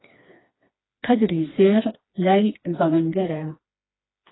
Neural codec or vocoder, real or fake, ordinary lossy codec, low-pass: codec, 16 kHz, 2 kbps, FreqCodec, smaller model; fake; AAC, 16 kbps; 7.2 kHz